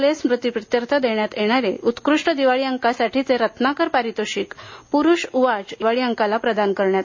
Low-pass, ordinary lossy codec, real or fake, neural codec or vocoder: 7.2 kHz; MP3, 32 kbps; real; none